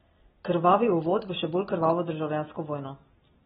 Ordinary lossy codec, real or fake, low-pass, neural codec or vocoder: AAC, 16 kbps; real; 19.8 kHz; none